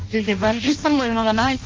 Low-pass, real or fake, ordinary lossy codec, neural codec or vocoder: 7.2 kHz; fake; Opus, 32 kbps; codec, 16 kHz in and 24 kHz out, 0.6 kbps, FireRedTTS-2 codec